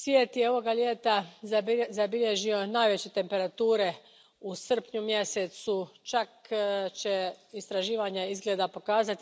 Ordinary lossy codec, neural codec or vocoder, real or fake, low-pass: none; none; real; none